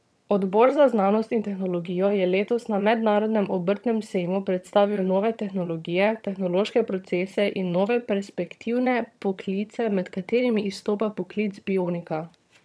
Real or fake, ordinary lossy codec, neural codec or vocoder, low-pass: fake; none; vocoder, 22.05 kHz, 80 mel bands, HiFi-GAN; none